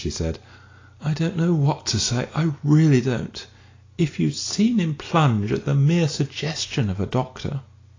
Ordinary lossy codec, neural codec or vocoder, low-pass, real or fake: AAC, 32 kbps; none; 7.2 kHz; real